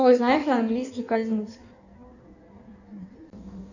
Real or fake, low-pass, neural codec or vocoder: fake; 7.2 kHz; codec, 16 kHz in and 24 kHz out, 1.1 kbps, FireRedTTS-2 codec